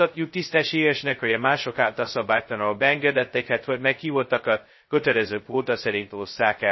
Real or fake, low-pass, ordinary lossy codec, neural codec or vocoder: fake; 7.2 kHz; MP3, 24 kbps; codec, 16 kHz, 0.2 kbps, FocalCodec